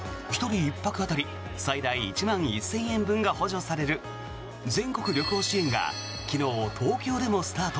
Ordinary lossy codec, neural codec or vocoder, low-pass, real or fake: none; none; none; real